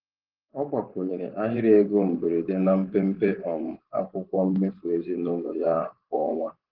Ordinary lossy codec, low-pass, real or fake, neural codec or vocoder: Opus, 16 kbps; 5.4 kHz; fake; vocoder, 24 kHz, 100 mel bands, Vocos